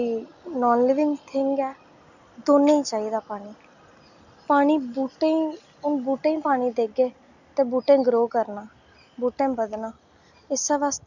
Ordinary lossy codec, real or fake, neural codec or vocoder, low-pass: none; real; none; 7.2 kHz